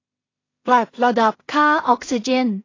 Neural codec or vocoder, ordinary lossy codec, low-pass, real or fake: codec, 16 kHz in and 24 kHz out, 0.4 kbps, LongCat-Audio-Codec, two codebook decoder; AAC, 32 kbps; 7.2 kHz; fake